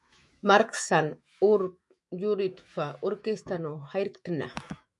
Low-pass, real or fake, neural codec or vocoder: 10.8 kHz; fake; autoencoder, 48 kHz, 128 numbers a frame, DAC-VAE, trained on Japanese speech